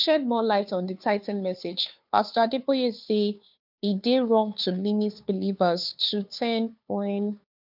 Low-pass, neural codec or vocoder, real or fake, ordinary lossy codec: 5.4 kHz; codec, 16 kHz, 2 kbps, FunCodec, trained on Chinese and English, 25 frames a second; fake; none